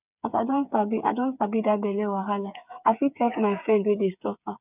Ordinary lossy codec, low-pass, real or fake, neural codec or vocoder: none; 3.6 kHz; fake; codec, 16 kHz, 8 kbps, FreqCodec, smaller model